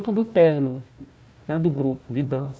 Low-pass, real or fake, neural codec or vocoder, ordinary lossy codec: none; fake; codec, 16 kHz, 1 kbps, FunCodec, trained on Chinese and English, 50 frames a second; none